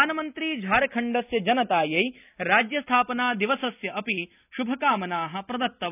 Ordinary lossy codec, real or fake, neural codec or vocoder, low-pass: none; real; none; 3.6 kHz